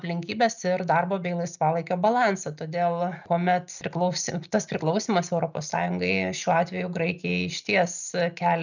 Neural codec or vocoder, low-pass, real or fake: none; 7.2 kHz; real